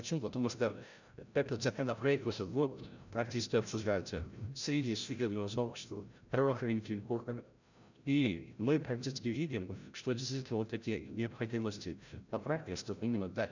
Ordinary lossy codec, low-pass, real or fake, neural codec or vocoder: none; 7.2 kHz; fake; codec, 16 kHz, 0.5 kbps, FreqCodec, larger model